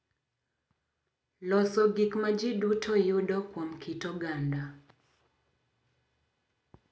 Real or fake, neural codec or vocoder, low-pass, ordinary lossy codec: real; none; none; none